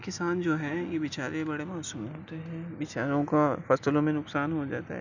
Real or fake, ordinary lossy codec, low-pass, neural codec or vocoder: real; none; 7.2 kHz; none